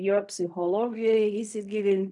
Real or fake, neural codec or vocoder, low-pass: fake; codec, 16 kHz in and 24 kHz out, 0.4 kbps, LongCat-Audio-Codec, fine tuned four codebook decoder; 10.8 kHz